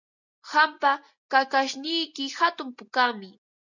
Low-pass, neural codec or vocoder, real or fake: 7.2 kHz; none; real